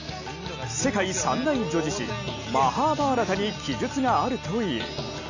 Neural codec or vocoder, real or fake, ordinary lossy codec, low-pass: none; real; none; 7.2 kHz